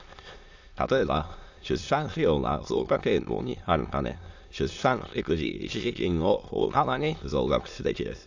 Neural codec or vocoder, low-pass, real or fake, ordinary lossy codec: autoencoder, 22.05 kHz, a latent of 192 numbers a frame, VITS, trained on many speakers; 7.2 kHz; fake; AAC, 48 kbps